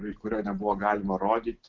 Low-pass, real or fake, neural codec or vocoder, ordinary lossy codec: 7.2 kHz; real; none; Opus, 16 kbps